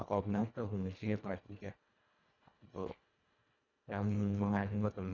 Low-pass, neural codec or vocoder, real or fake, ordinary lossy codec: 7.2 kHz; codec, 24 kHz, 1.5 kbps, HILCodec; fake; none